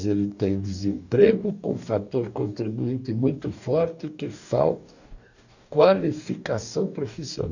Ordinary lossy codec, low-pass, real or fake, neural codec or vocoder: none; 7.2 kHz; fake; codec, 44.1 kHz, 2.6 kbps, DAC